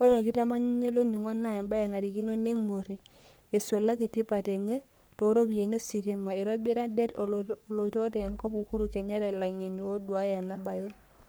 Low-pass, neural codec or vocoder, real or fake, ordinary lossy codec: none; codec, 44.1 kHz, 3.4 kbps, Pupu-Codec; fake; none